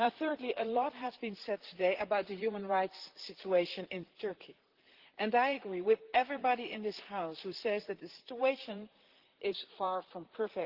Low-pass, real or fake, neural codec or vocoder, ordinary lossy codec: 5.4 kHz; fake; vocoder, 44.1 kHz, 128 mel bands, Pupu-Vocoder; Opus, 16 kbps